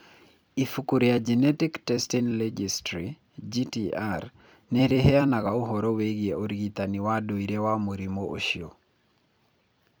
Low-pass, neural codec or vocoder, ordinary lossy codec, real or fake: none; vocoder, 44.1 kHz, 128 mel bands every 256 samples, BigVGAN v2; none; fake